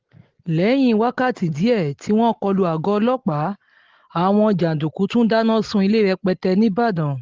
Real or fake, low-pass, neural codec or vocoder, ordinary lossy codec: real; 7.2 kHz; none; Opus, 16 kbps